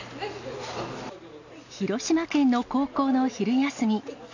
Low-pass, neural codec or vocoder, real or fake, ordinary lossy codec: 7.2 kHz; none; real; none